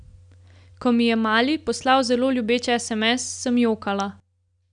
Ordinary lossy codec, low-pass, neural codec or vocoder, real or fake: none; 9.9 kHz; none; real